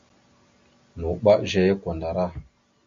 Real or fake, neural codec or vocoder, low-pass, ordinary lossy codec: real; none; 7.2 kHz; MP3, 48 kbps